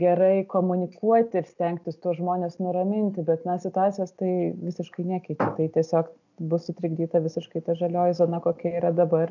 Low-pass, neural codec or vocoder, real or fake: 7.2 kHz; none; real